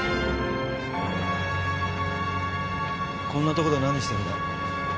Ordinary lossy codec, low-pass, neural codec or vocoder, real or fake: none; none; none; real